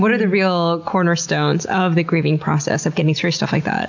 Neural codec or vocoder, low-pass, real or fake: none; 7.2 kHz; real